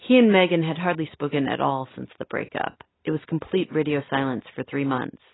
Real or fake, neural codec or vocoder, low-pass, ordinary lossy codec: real; none; 7.2 kHz; AAC, 16 kbps